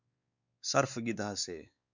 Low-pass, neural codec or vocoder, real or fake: 7.2 kHz; codec, 16 kHz, 4 kbps, X-Codec, WavLM features, trained on Multilingual LibriSpeech; fake